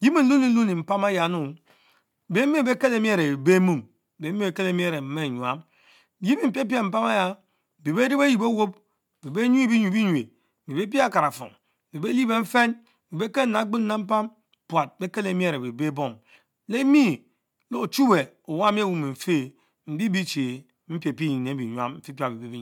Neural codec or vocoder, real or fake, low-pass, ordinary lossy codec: none; real; 19.8 kHz; MP3, 96 kbps